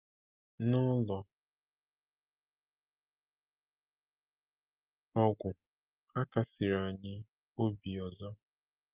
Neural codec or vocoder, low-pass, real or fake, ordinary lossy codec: none; 3.6 kHz; real; Opus, 24 kbps